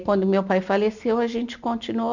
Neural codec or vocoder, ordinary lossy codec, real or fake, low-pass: none; none; real; 7.2 kHz